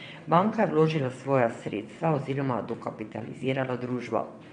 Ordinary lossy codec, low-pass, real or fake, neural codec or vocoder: MP3, 64 kbps; 9.9 kHz; fake; vocoder, 22.05 kHz, 80 mel bands, WaveNeXt